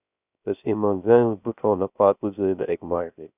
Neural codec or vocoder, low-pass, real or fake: codec, 16 kHz, 0.3 kbps, FocalCodec; 3.6 kHz; fake